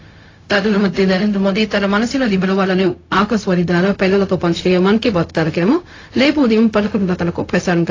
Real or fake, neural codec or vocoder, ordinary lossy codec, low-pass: fake; codec, 16 kHz, 0.4 kbps, LongCat-Audio-Codec; AAC, 32 kbps; 7.2 kHz